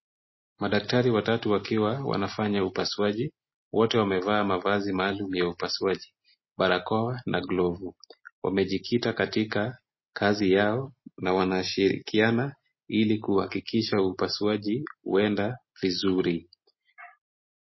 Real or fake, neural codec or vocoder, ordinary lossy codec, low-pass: real; none; MP3, 24 kbps; 7.2 kHz